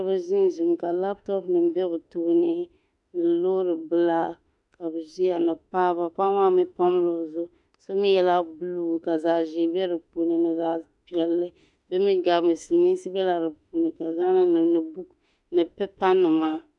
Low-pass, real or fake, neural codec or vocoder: 10.8 kHz; fake; autoencoder, 48 kHz, 32 numbers a frame, DAC-VAE, trained on Japanese speech